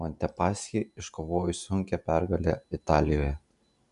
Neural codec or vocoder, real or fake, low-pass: none; real; 10.8 kHz